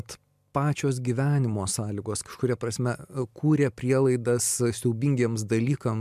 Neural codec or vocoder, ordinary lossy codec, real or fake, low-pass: none; MP3, 96 kbps; real; 14.4 kHz